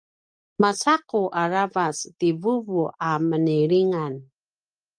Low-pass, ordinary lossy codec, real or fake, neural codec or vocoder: 9.9 kHz; Opus, 32 kbps; real; none